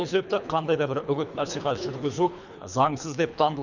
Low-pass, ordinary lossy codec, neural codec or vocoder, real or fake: 7.2 kHz; none; codec, 24 kHz, 3 kbps, HILCodec; fake